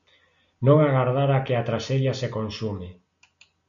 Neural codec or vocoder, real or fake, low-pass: none; real; 7.2 kHz